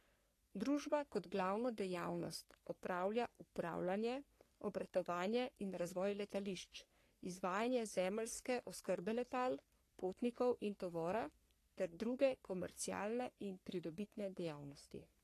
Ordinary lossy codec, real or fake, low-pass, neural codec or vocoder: AAC, 48 kbps; fake; 14.4 kHz; codec, 44.1 kHz, 3.4 kbps, Pupu-Codec